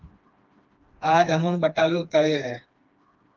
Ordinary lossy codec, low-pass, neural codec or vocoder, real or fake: Opus, 24 kbps; 7.2 kHz; codec, 16 kHz, 2 kbps, FreqCodec, smaller model; fake